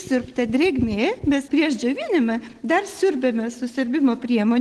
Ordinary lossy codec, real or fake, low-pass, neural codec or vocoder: Opus, 16 kbps; real; 10.8 kHz; none